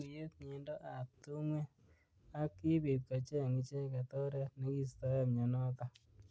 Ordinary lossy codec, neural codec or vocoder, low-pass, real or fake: none; none; none; real